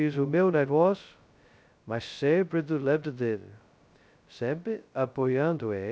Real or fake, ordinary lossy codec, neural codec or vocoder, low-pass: fake; none; codec, 16 kHz, 0.2 kbps, FocalCodec; none